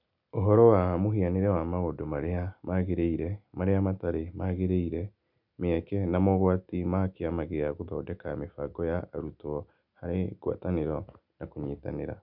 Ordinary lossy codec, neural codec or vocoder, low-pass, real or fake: none; none; 5.4 kHz; real